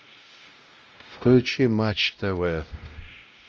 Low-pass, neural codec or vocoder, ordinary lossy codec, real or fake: 7.2 kHz; codec, 16 kHz, 0.5 kbps, X-Codec, WavLM features, trained on Multilingual LibriSpeech; Opus, 24 kbps; fake